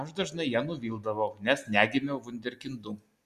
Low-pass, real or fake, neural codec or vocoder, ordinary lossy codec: 14.4 kHz; real; none; Opus, 64 kbps